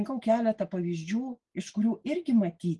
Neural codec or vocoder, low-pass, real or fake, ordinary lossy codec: none; 10.8 kHz; real; Opus, 24 kbps